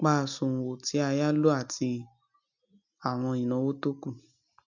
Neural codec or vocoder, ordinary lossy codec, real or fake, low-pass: none; none; real; 7.2 kHz